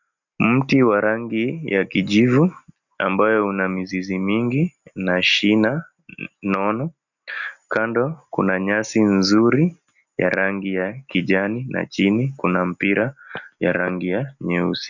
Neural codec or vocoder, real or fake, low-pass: none; real; 7.2 kHz